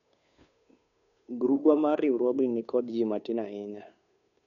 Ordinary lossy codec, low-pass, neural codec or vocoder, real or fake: none; 7.2 kHz; codec, 16 kHz, 2 kbps, FunCodec, trained on Chinese and English, 25 frames a second; fake